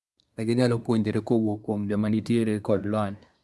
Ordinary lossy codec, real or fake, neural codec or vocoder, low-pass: none; fake; codec, 24 kHz, 1 kbps, SNAC; none